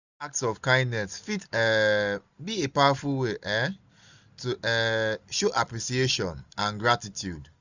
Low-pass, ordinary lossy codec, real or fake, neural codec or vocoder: 7.2 kHz; none; real; none